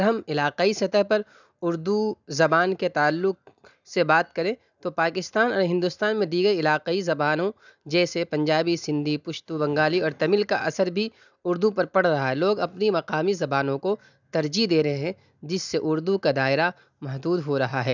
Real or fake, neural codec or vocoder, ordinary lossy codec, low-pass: real; none; none; 7.2 kHz